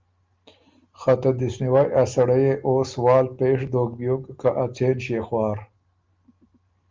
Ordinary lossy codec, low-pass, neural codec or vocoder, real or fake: Opus, 32 kbps; 7.2 kHz; none; real